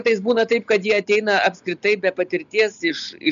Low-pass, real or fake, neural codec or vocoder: 7.2 kHz; real; none